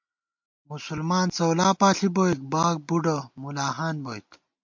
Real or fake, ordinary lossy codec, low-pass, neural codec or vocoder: real; MP3, 48 kbps; 7.2 kHz; none